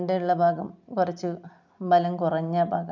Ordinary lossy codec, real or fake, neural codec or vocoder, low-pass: none; real; none; 7.2 kHz